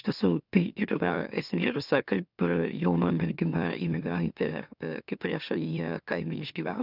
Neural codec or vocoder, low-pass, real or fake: autoencoder, 44.1 kHz, a latent of 192 numbers a frame, MeloTTS; 5.4 kHz; fake